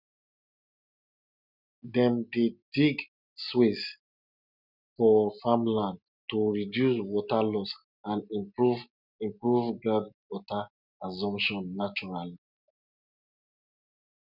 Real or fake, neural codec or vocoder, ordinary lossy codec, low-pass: real; none; none; 5.4 kHz